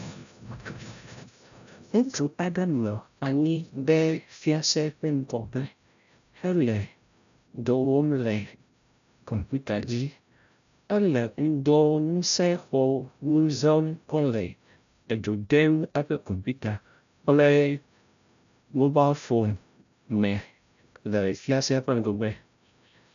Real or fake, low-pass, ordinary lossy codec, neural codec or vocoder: fake; 7.2 kHz; AAC, 96 kbps; codec, 16 kHz, 0.5 kbps, FreqCodec, larger model